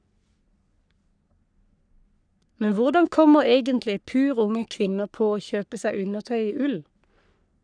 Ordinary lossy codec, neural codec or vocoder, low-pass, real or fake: none; codec, 44.1 kHz, 3.4 kbps, Pupu-Codec; 9.9 kHz; fake